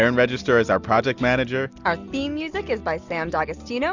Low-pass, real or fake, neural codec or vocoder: 7.2 kHz; real; none